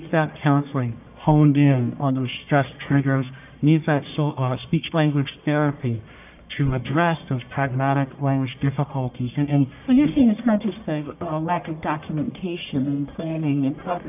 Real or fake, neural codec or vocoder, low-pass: fake; codec, 44.1 kHz, 1.7 kbps, Pupu-Codec; 3.6 kHz